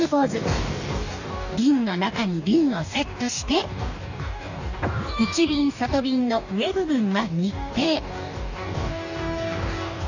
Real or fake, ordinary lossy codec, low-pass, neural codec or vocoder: fake; none; 7.2 kHz; codec, 44.1 kHz, 2.6 kbps, DAC